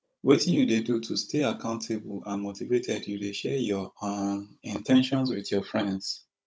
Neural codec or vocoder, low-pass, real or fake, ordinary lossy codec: codec, 16 kHz, 16 kbps, FunCodec, trained on Chinese and English, 50 frames a second; none; fake; none